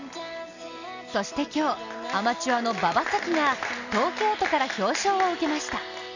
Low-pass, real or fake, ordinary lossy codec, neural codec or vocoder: 7.2 kHz; real; none; none